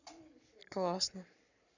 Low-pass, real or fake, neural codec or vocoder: 7.2 kHz; fake; codec, 44.1 kHz, 7.8 kbps, Pupu-Codec